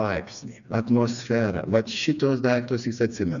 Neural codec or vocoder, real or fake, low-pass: codec, 16 kHz, 2 kbps, FreqCodec, smaller model; fake; 7.2 kHz